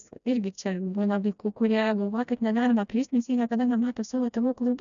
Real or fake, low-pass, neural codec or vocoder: fake; 7.2 kHz; codec, 16 kHz, 1 kbps, FreqCodec, smaller model